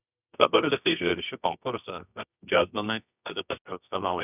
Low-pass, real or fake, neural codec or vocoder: 3.6 kHz; fake; codec, 24 kHz, 0.9 kbps, WavTokenizer, medium music audio release